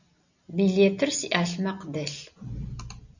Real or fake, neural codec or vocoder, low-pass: real; none; 7.2 kHz